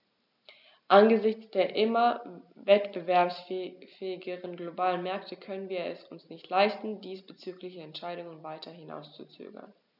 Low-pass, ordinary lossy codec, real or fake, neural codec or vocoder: 5.4 kHz; none; real; none